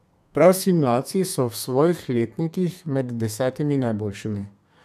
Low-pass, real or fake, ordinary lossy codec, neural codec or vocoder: 14.4 kHz; fake; none; codec, 32 kHz, 1.9 kbps, SNAC